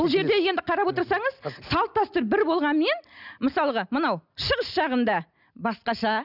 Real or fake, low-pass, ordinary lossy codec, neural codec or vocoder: real; 5.4 kHz; none; none